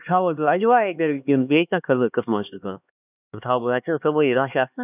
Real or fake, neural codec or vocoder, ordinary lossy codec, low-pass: fake; codec, 16 kHz, 2 kbps, X-Codec, HuBERT features, trained on LibriSpeech; none; 3.6 kHz